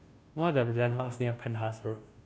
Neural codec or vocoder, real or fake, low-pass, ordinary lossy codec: codec, 16 kHz, 0.5 kbps, FunCodec, trained on Chinese and English, 25 frames a second; fake; none; none